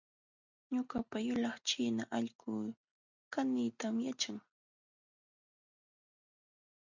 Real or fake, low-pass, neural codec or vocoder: real; 7.2 kHz; none